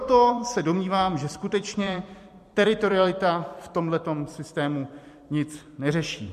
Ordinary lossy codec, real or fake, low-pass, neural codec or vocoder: MP3, 64 kbps; fake; 14.4 kHz; vocoder, 44.1 kHz, 128 mel bands every 256 samples, BigVGAN v2